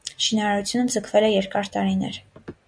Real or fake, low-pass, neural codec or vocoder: real; 9.9 kHz; none